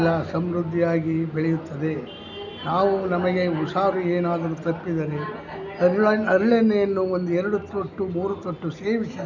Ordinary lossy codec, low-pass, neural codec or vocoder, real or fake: none; 7.2 kHz; none; real